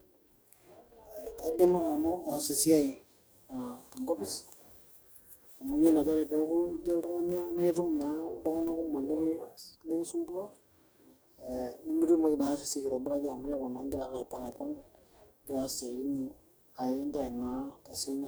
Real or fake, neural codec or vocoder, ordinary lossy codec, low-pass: fake; codec, 44.1 kHz, 2.6 kbps, DAC; none; none